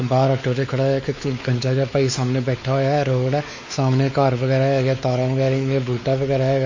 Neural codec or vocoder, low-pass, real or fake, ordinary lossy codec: codec, 16 kHz, 4 kbps, X-Codec, WavLM features, trained on Multilingual LibriSpeech; 7.2 kHz; fake; MP3, 32 kbps